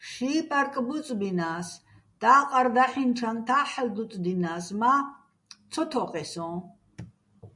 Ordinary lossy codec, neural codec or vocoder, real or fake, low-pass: MP3, 96 kbps; none; real; 10.8 kHz